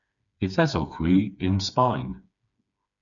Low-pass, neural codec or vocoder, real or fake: 7.2 kHz; codec, 16 kHz, 4 kbps, FreqCodec, smaller model; fake